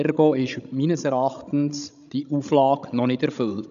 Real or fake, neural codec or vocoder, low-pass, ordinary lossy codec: fake; codec, 16 kHz, 16 kbps, FreqCodec, larger model; 7.2 kHz; none